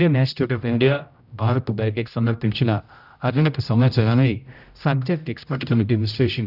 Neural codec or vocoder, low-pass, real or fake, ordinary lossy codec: codec, 16 kHz, 0.5 kbps, X-Codec, HuBERT features, trained on general audio; 5.4 kHz; fake; none